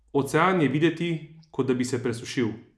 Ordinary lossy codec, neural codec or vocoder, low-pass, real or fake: none; none; none; real